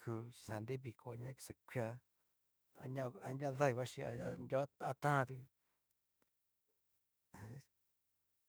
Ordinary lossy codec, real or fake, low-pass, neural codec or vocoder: none; fake; none; autoencoder, 48 kHz, 32 numbers a frame, DAC-VAE, trained on Japanese speech